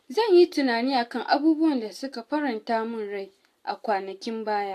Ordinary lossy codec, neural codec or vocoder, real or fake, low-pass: AAC, 64 kbps; none; real; 14.4 kHz